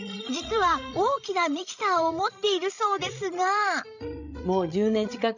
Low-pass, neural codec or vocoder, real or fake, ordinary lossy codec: 7.2 kHz; codec, 16 kHz, 16 kbps, FreqCodec, larger model; fake; none